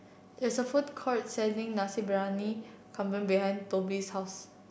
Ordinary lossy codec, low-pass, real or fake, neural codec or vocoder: none; none; real; none